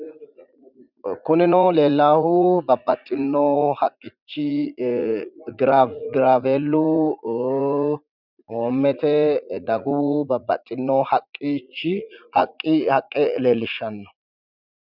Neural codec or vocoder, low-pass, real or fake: vocoder, 44.1 kHz, 80 mel bands, Vocos; 5.4 kHz; fake